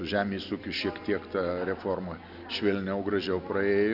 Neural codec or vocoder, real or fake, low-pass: none; real; 5.4 kHz